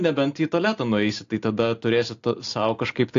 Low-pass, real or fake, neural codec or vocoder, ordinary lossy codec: 7.2 kHz; real; none; AAC, 48 kbps